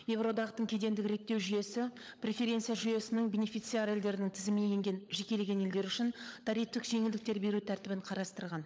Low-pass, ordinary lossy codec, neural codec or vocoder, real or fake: none; none; codec, 16 kHz, 16 kbps, FunCodec, trained on LibriTTS, 50 frames a second; fake